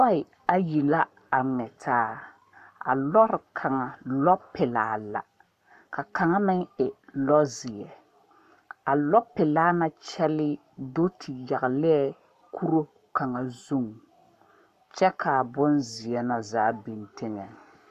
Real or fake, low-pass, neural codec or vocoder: fake; 14.4 kHz; codec, 44.1 kHz, 7.8 kbps, Pupu-Codec